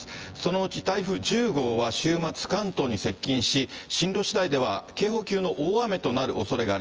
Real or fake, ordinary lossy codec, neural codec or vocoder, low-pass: fake; Opus, 24 kbps; vocoder, 24 kHz, 100 mel bands, Vocos; 7.2 kHz